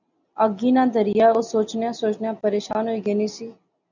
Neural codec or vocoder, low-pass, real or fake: none; 7.2 kHz; real